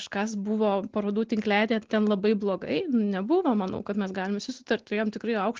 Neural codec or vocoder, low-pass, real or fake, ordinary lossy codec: none; 7.2 kHz; real; Opus, 16 kbps